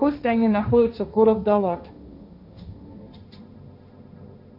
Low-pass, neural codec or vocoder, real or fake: 5.4 kHz; codec, 16 kHz, 1.1 kbps, Voila-Tokenizer; fake